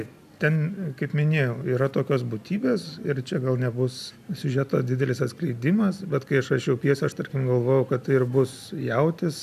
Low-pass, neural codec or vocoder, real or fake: 14.4 kHz; none; real